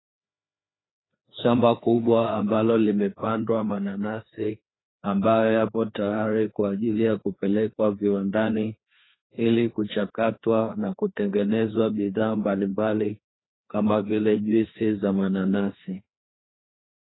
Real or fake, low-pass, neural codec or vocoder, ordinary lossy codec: fake; 7.2 kHz; codec, 16 kHz, 2 kbps, FreqCodec, larger model; AAC, 16 kbps